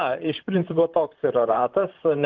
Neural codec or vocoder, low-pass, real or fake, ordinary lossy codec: vocoder, 44.1 kHz, 128 mel bands, Pupu-Vocoder; 7.2 kHz; fake; Opus, 32 kbps